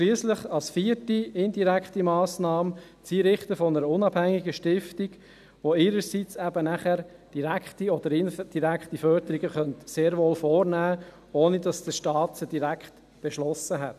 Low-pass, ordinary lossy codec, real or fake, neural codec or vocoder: 14.4 kHz; none; real; none